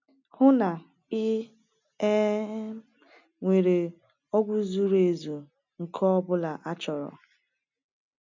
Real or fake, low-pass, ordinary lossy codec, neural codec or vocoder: real; 7.2 kHz; MP3, 64 kbps; none